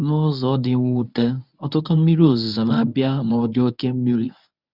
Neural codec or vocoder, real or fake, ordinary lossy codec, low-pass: codec, 24 kHz, 0.9 kbps, WavTokenizer, medium speech release version 1; fake; none; 5.4 kHz